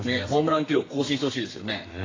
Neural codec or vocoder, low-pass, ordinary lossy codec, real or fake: codec, 32 kHz, 1.9 kbps, SNAC; 7.2 kHz; AAC, 32 kbps; fake